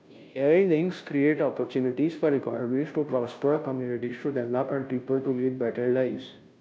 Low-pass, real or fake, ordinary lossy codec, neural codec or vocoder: none; fake; none; codec, 16 kHz, 0.5 kbps, FunCodec, trained on Chinese and English, 25 frames a second